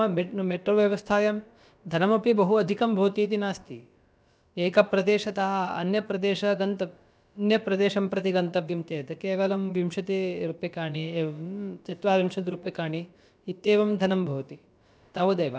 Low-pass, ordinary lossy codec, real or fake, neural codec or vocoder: none; none; fake; codec, 16 kHz, about 1 kbps, DyCAST, with the encoder's durations